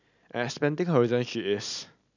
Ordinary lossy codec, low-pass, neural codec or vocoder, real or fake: none; 7.2 kHz; none; real